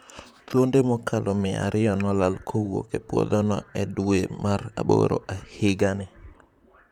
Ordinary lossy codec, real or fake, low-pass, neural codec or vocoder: none; fake; 19.8 kHz; vocoder, 44.1 kHz, 128 mel bands, Pupu-Vocoder